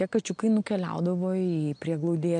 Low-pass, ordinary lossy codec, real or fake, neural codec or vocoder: 9.9 kHz; MP3, 64 kbps; real; none